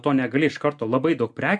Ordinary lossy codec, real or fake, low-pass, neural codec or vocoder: MP3, 64 kbps; real; 10.8 kHz; none